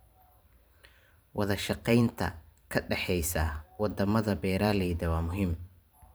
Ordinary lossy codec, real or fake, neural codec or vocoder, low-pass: none; fake; vocoder, 44.1 kHz, 128 mel bands every 512 samples, BigVGAN v2; none